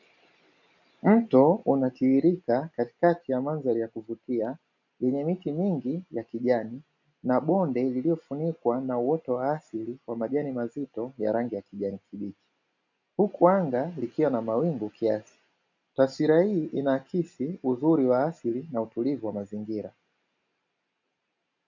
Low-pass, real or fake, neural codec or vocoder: 7.2 kHz; real; none